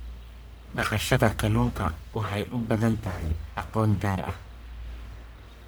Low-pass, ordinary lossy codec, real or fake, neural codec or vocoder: none; none; fake; codec, 44.1 kHz, 1.7 kbps, Pupu-Codec